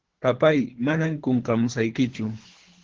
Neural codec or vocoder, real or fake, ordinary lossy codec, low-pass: codec, 24 kHz, 3 kbps, HILCodec; fake; Opus, 16 kbps; 7.2 kHz